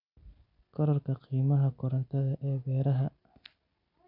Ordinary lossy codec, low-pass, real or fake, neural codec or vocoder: none; 5.4 kHz; real; none